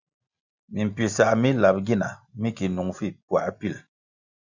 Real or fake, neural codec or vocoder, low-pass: real; none; 7.2 kHz